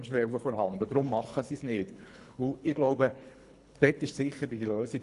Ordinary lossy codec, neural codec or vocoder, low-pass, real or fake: none; codec, 24 kHz, 3 kbps, HILCodec; 10.8 kHz; fake